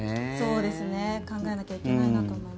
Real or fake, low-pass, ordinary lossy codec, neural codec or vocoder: real; none; none; none